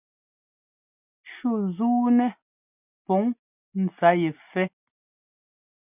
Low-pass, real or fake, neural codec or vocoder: 3.6 kHz; real; none